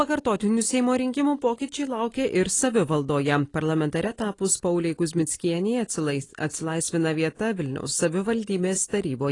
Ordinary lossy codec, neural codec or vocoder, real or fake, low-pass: AAC, 32 kbps; none; real; 10.8 kHz